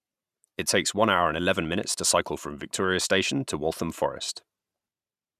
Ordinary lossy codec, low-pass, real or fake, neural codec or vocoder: none; 14.4 kHz; fake; vocoder, 44.1 kHz, 128 mel bands every 512 samples, BigVGAN v2